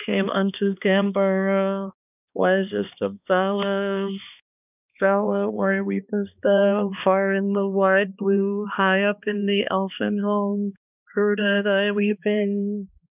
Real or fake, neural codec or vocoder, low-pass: fake; codec, 16 kHz, 2 kbps, X-Codec, HuBERT features, trained on balanced general audio; 3.6 kHz